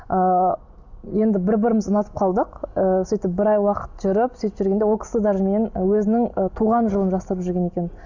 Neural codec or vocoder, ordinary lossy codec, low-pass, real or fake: none; none; 7.2 kHz; real